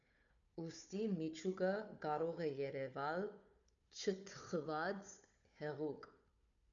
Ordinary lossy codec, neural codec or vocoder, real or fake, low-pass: MP3, 96 kbps; codec, 16 kHz, 4 kbps, FunCodec, trained on Chinese and English, 50 frames a second; fake; 7.2 kHz